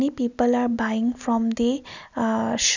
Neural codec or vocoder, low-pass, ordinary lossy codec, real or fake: none; 7.2 kHz; none; real